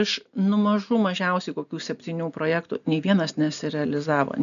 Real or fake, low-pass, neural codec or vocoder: real; 7.2 kHz; none